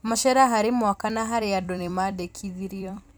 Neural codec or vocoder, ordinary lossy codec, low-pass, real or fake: vocoder, 44.1 kHz, 128 mel bands every 256 samples, BigVGAN v2; none; none; fake